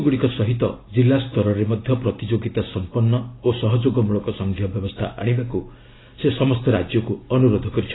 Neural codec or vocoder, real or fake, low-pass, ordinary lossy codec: none; real; 7.2 kHz; AAC, 16 kbps